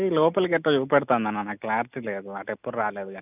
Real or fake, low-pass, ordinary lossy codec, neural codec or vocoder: real; 3.6 kHz; none; none